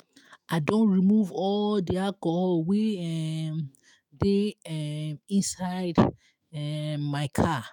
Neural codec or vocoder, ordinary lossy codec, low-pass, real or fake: autoencoder, 48 kHz, 128 numbers a frame, DAC-VAE, trained on Japanese speech; none; 19.8 kHz; fake